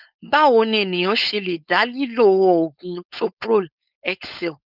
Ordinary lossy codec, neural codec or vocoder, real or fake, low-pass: none; codec, 16 kHz, 4.8 kbps, FACodec; fake; 5.4 kHz